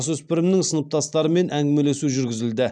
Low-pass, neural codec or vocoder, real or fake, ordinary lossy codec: 9.9 kHz; none; real; none